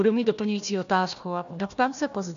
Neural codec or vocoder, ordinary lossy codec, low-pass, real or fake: codec, 16 kHz, 1 kbps, FunCodec, trained on Chinese and English, 50 frames a second; AAC, 96 kbps; 7.2 kHz; fake